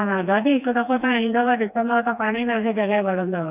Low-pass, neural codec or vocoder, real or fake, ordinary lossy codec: 3.6 kHz; codec, 16 kHz, 2 kbps, FreqCodec, smaller model; fake; none